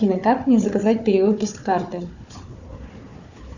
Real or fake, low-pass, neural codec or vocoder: fake; 7.2 kHz; codec, 16 kHz, 4 kbps, FunCodec, trained on Chinese and English, 50 frames a second